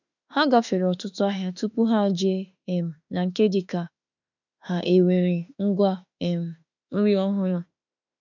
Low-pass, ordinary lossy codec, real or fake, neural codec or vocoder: 7.2 kHz; none; fake; autoencoder, 48 kHz, 32 numbers a frame, DAC-VAE, trained on Japanese speech